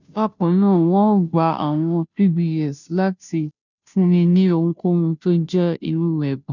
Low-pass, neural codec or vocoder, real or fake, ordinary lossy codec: 7.2 kHz; codec, 16 kHz, 0.5 kbps, FunCodec, trained on Chinese and English, 25 frames a second; fake; none